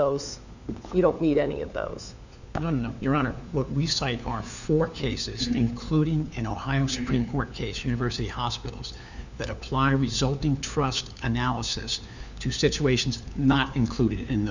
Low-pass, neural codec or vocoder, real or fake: 7.2 kHz; codec, 16 kHz, 4 kbps, FunCodec, trained on LibriTTS, 50 frames a second; fake